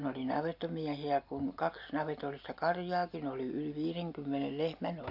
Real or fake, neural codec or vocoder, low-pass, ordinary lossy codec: real; none; 5.4 kHz; AAC, 48 kbps